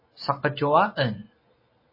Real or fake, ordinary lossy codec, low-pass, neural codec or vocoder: real; MP3, 24 kbps; 5.4 kHz; none